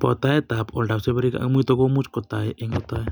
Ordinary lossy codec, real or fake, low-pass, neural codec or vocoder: none; real; 19.8 kHz; none